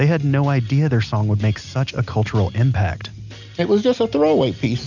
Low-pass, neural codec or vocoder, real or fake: 7.2 kHz; none; real